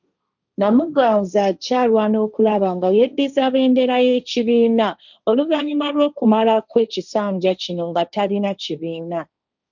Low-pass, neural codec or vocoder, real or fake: 7.2 kHz; codec, 16 kHz, 1.1 kbps, Voila-Tokenizer; fake